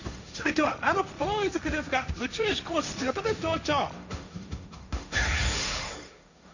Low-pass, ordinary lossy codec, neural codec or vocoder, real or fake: 7.2 kHz; none; codec, 16 kHz, 1.1 kbps, Voila-Tokenizer; fake